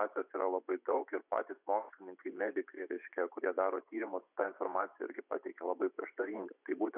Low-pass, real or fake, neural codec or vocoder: 3.6 kHz; fake; vocoder, 24 kHz, 100 mel bands, Vocos